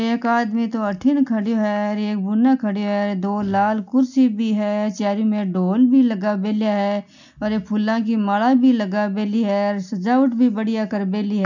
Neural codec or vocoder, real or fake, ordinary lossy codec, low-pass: none; real; none; 7.2 kHz